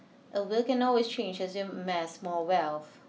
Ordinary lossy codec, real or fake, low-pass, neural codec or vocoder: none; real; none; none